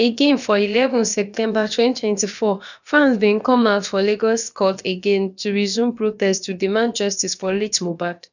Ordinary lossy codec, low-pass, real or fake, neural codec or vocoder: none; 7.2 kHz; fake; codec, 16 kHz, about 1 kbps, DyCAST, with the encoder's durations